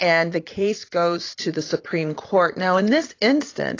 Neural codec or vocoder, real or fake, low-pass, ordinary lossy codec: vocoder, 44.1 kHz, 80 mel bands, Vocos; fake; 7.2 kHz; AAC, 32 kbps